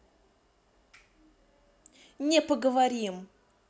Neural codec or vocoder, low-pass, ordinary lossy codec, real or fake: none; none; none; real